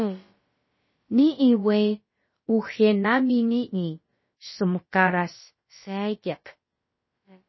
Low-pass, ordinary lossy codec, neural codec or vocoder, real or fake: 7.2 kHz; MP3, 24 kbps; codec, 16 kHz, about 1 kbps, DyCAST, with the encoder's durations; fake